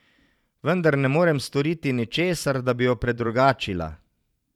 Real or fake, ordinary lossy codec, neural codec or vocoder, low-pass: fake; none; vocoder, 44.1 kHz, 128 mel bands every 512 samples, BigVGAN v2; 19.8 kHz